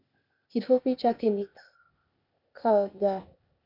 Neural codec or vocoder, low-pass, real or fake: codec, 16 kHz, 0.8 kbps, ZipCodec; 5.4 kHz; fake